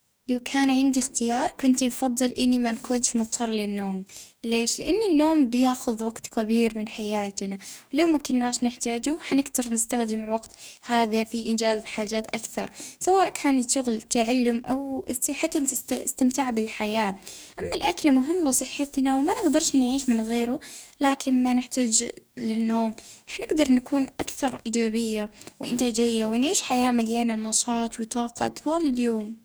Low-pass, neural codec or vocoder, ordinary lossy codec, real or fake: none; codec, 44.1 kHz, 2.6 kbps, DAC; none; fake